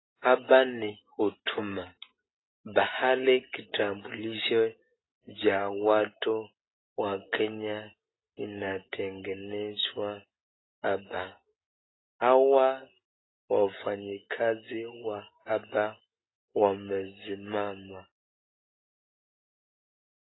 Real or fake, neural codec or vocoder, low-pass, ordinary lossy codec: real; none; 7.2 kHz; AAC, 16 kbps